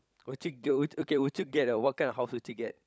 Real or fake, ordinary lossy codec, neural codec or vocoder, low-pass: fake; none; codec, 16 kHz, 16 kbps, FunCodec, trained on LibriTTS, 50 frames a second; none